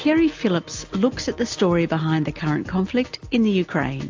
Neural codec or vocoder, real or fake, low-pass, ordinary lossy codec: none; real; 7.2 kHz; AAC, 48 kbps